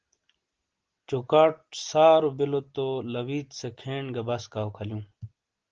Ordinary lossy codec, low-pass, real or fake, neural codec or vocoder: Opus, 16 kbps; 7.2 kHz; real; none